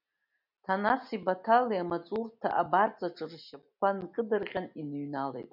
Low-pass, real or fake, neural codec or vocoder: 5.4 kHz; real; none